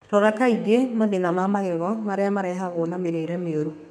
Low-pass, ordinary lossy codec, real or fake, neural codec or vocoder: 14.4 kHz; none; fake; codec, 32 kHz, 1.9 kbps, SNAC